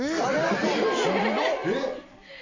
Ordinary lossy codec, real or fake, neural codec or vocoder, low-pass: MP3, 32 kbps; real; none; 7.2 kHz